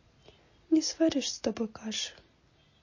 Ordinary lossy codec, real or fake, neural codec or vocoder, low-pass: MP3, 32 kbps; real; none; 7.2 kHz